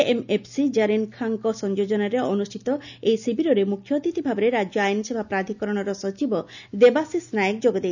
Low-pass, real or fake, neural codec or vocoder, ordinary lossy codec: 7.2 kHz; real; none; none